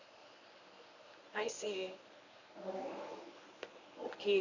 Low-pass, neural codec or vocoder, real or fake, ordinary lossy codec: 7.2 kHz; codec, 24 kHz, 0.9 kbps, WavTokenizer, medium speech release version 1; fake; none